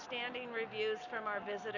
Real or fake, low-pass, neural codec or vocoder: real; 7.2 kHz; none